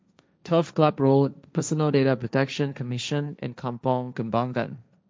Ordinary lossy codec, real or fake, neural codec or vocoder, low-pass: none; fake; codec, 16 kHz, 1.1 kbps, Voila-Tokenizer; none